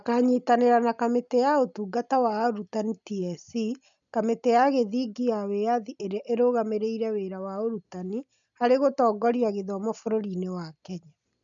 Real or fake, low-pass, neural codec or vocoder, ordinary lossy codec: real; 7.2 kHz; none; none